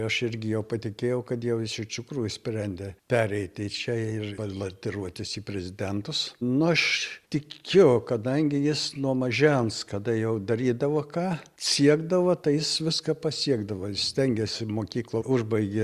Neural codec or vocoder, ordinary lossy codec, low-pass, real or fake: none; Opus, 64 kbps; 14.4 kHz; real